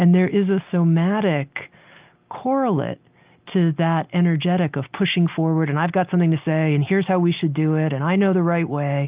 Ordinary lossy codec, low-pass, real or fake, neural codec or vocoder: Opus, 32 kbps; 3.6 kHz; real; none